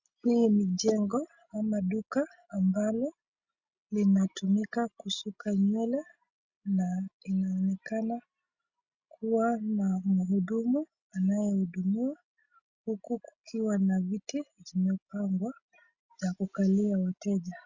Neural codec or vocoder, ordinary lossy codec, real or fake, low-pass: none; Opus, 64 kbps; real; 7.2 kHz